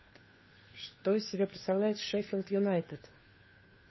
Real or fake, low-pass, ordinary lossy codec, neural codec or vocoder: fake; 7.2 kHz; MP3, 24 kbps; codec, 16 kHz, 2 kbps, FunCodec, trained on Chinese and English, 25 frames a second